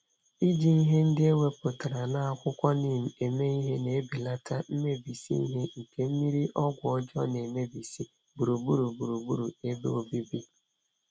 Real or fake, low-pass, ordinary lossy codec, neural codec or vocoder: real; none; none; none